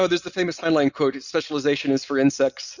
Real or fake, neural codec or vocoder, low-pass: real; none; 7.2 kHz